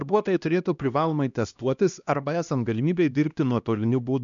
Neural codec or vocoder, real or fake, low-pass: codec, 16 kHz, 1 kbps, X-Codec, HuBERT features, trained on LibriSpeech; fake; 7.2 kHz